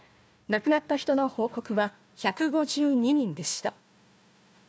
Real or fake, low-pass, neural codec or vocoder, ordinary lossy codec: fake; none; codec, 16 kHz, 1 kbps, FunCodec, trained on Chinese and English, 50 frames a second; none